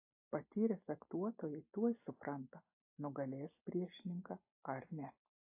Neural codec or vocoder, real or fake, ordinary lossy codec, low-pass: none; real; AAC, 32 kbps; 3.6 kHz